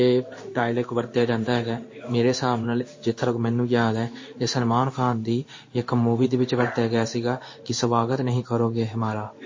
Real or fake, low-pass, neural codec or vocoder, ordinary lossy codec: fake; 7.2 kHz; codec, 16 kHz in and 24 kHz out, 1 kbps, XY-Tokenizer; MP3, 32 kbps